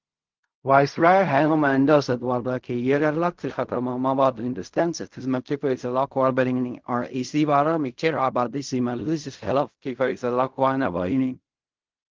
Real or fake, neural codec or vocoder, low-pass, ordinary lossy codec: fake; codec, 16 kHz in and 24 kHz out, 0.4 kbps, LongCat-Audio-Codec, fine tuned four codebook decoder; 7.2 kHz; Opus, 32 kbps